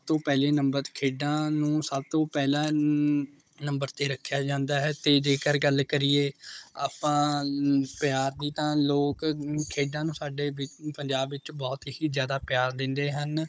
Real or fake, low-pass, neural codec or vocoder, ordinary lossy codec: fake; none; codec, 16 kHz, 16 kbps, FunCodec, trained on Chinese and English, 50 frames a second; none